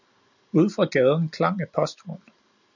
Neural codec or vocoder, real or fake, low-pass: none; real; 7.2 kHz